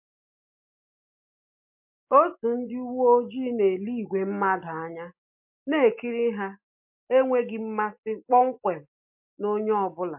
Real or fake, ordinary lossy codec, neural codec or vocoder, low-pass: real; MP3, 32 kbps; none; 3.6 kHz